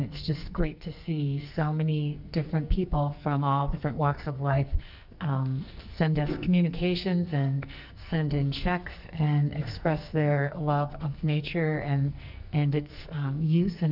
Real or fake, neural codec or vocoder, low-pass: fake; codec, 44.1 kHz, 2.6 kbps, SNAC; 5.4 kHz